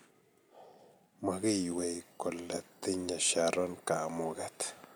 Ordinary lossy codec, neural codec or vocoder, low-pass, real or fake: none; none; none; real